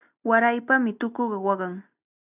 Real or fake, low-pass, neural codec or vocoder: real; 3.6 kHz; none